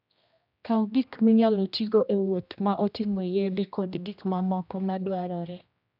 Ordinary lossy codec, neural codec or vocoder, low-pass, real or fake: none; codec, 16 kHz, 1 kbps, X-Codec, HuBERT features, trained on general audio; 5.4 kHz; fake